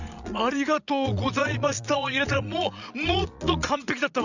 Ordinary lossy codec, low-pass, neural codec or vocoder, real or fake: none; 7.2 kHz; vocoder, 22.05 kHz, 80 mel bands, Vocos; fake